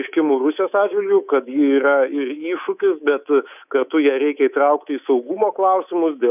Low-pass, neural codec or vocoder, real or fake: 3.6 kHz; codec, 24 kHz, 3.1 kbps, DualCodec; fake